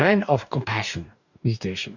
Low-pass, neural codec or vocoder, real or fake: 7.2 kHz; codec, 44.1 kHz, 2.6 kbps, DAC; fake